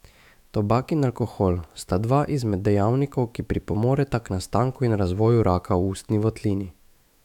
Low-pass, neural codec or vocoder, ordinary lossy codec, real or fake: 19.8 kHz; autoencoder, 48 kHz, 128 numbers a frame, DAC-VAE, trained on Japanese speech; none; fake